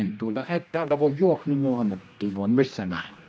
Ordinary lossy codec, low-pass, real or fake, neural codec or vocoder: none; none; fake; codec, 16 kHz, 1 kbps, X-Codec, HuBERT features, trained on general audio